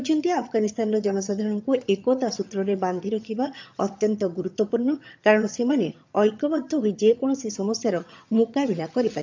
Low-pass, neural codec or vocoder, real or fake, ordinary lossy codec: 7.2 kHz; vocoder, 22.05 kHz, 80 mel bands, HiFi-GAN; fake; MP3, 64 kbps